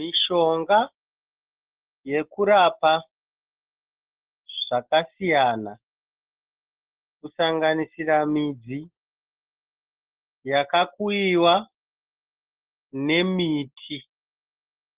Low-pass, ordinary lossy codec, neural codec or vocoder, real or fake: 3.6 kHz; Opus, 16 kbps; none; real